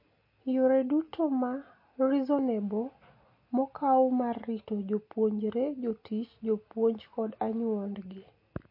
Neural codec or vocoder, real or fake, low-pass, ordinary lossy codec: none; real; 5.4 kHz; MP3, 32 kbps